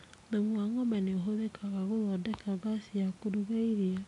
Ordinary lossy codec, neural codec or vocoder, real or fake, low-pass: none; none; real; 10.8 kHz